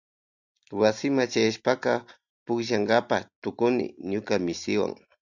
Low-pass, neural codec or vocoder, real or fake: 7.2 kHz; none; real